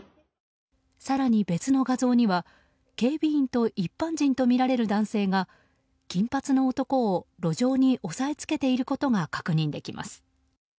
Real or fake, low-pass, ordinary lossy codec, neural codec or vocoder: real; none; none; none